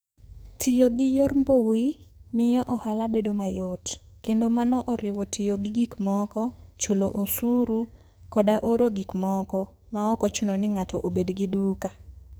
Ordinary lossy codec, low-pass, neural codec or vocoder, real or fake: none; none; codec, 44.1 kHz, 2.6 kbps, SNAC; fake